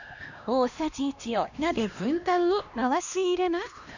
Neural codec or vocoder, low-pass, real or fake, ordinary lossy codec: codec, 16 kHz, 1 kbps, X-Codec, HuBERT features, trained on LibriSpeech; 7.2 kHz; fake; none